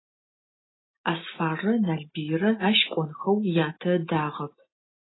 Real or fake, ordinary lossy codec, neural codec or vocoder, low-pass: real; AAC, 16 kbps; none; 7.2 kHz